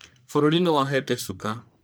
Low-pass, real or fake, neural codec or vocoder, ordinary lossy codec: none; fake; codec, 44.1 kHz, 1.7 kbps, Pupu-Codec; none